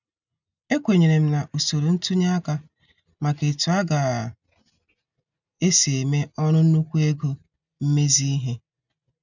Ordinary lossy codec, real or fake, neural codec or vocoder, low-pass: none; real; none; 7.2 kHz